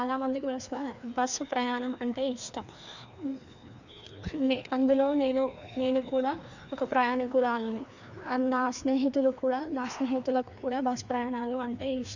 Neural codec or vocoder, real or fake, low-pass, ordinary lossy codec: codec, 16 kHz, 2 kbps, FreqCodec, larger model; fake; 7.2 kHz; none